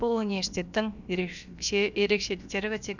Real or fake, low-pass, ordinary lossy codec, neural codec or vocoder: fake; 7.2 kHz; none; codec, 16 kHz, about 1 kbps, DyCAST, with the encoder's durations